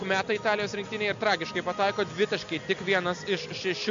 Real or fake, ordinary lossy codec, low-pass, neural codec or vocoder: real; MP3, 64 kbps; 7.2 kHz; none